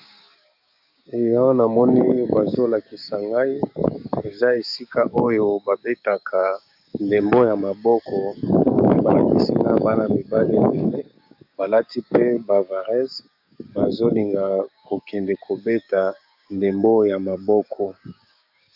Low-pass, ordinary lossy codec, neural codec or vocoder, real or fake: 5.4 kHz; AAC, 48 kbps; codec, 16 kHz, 6 kbps, DAC; fake